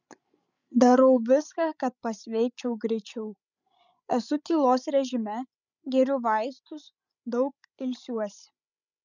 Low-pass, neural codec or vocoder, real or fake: 7.2 kHz; codec, 16 kHz, 16 kbps, FreqCodec, larger model; fake